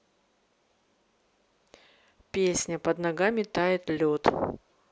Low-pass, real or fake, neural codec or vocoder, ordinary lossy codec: none; real; none; none